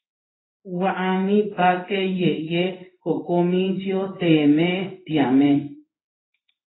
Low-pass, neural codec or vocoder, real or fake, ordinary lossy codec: 7.2 kHz; codec, 16 kHz in and 24 kHz out, 1 kbps, XY-Tokenizer; fake; AAC, 16 kbps